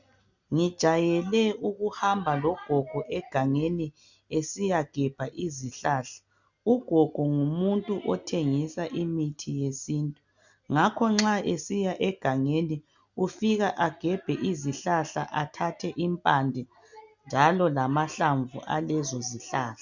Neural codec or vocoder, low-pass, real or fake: none; 7.2 kHz; real